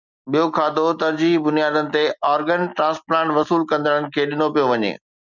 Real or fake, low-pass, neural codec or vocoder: real; 7.2 kHz; none